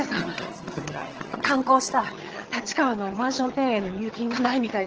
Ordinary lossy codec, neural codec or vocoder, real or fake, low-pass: Opus, 16 kbps; vocoder, 22.05 kHz, 80 mel bands, HiFi-GAN; fake; 7.2 kHz